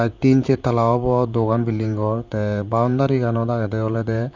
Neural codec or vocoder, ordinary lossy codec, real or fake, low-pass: vocoder, 44.1 kHz, 128 mel bands, Pupu-Vocoder; none; fake; 7.2 kHz